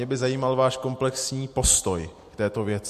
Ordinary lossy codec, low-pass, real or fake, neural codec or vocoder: MP3, 64 kbps; 14.4 kHz; real; none